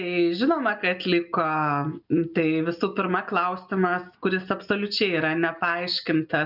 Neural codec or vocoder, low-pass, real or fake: none; 5.4 kHz; real